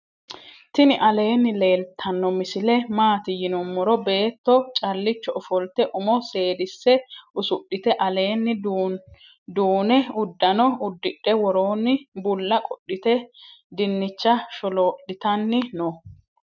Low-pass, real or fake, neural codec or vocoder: 7.2 kHz; real; none